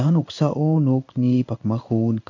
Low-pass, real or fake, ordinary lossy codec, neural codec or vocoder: 7.2 kHz; fake; none; codec, 16 kHz in and 24 kHz out, 1 kbps, XY-Tokenizer